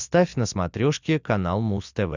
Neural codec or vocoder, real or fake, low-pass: none; real; 7.2 kHz